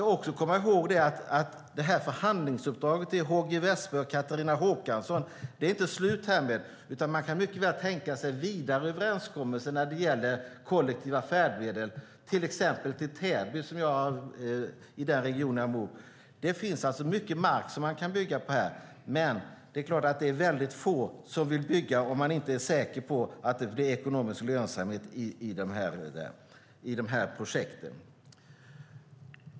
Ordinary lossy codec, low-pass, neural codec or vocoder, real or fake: none; none; none; real